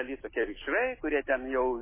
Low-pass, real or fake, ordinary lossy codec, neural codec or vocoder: 3.6 kHz; real; MP3, 16 kbps; none